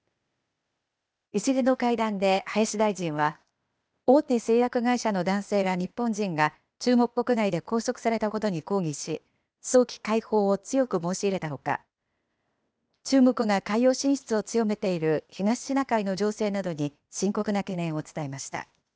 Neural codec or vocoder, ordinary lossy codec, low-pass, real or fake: codec, 16 kHz, 0.8 kbps, ZipCodec; none; none; fake